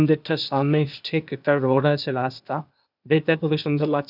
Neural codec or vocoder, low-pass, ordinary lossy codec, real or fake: codec, 16 kHz, 0.8 kbps, ZipCodec; 5.4 kHz; none; fake